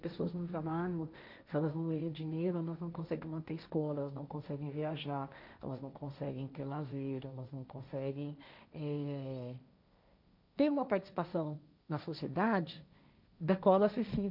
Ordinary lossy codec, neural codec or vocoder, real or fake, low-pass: none; codec, 16 kHz, 1.1 kbps, Voila-Tokenizer; fake; 5.4 kHz